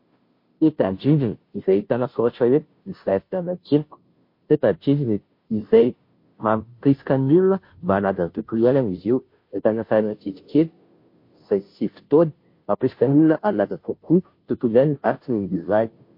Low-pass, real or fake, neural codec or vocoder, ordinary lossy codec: 5.4 kHz; fake; codec, 16 kHz, 0.5 kbps, FunCodec, trained on Chinese and English, 25 frames a second; MP3, 32 kbps